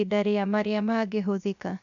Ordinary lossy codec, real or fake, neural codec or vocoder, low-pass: none; fake; codec, 16 kHz, 0.7 kbps, FocalCodec; 7.2 kHz